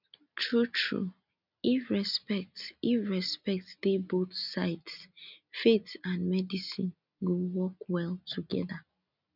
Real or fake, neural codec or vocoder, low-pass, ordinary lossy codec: real; none; 5.4 kHz; none